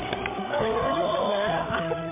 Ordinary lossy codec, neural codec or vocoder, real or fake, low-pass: MP3, 24 kbps; codec, 16 kHz, 16 kbps, FreqCodec, larger model; fake; 3.6 kHz